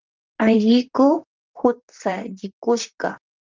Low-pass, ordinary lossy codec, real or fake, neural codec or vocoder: 7.2 kHz; Opus, 24 kbps; fake; vocoder, 44.1 kHz, 128 mel bands, Pupu-Vocoder